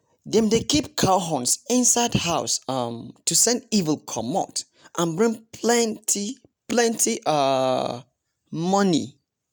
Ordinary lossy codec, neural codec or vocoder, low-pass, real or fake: none; none; none; real